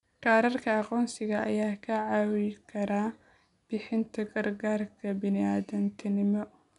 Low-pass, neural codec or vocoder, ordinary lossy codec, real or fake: 10.8 kHz; none; none; real